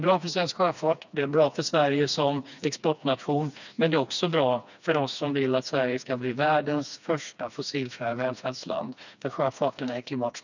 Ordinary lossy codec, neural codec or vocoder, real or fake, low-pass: none; codec, 16 kHz, 2 kbps, FreqCodec, smaller model; fake; 7.2 kHz